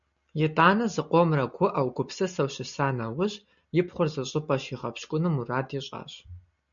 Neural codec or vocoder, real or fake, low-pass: none; real; 7.2 kHz